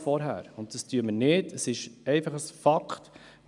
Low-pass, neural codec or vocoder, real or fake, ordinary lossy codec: 10.8 kHz; none; real; none